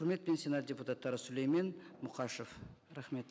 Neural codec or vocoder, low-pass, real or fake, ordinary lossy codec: none; none; real; none